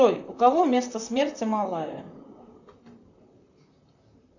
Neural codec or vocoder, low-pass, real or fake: vocoder, 44.1 kHz, 128 mel bands, Pupu-Vocoder; 7.2 kHz; fake